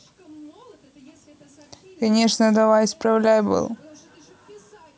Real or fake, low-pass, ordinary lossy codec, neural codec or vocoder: real; none; none; none